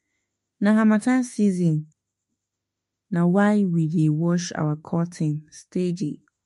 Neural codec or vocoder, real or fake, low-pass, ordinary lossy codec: autoencoder, 48 kHz, 32 numbers a frame, DAC-VAE, trained on Japanese speech; fake; 14.4 kHz; MP3, 48 kbps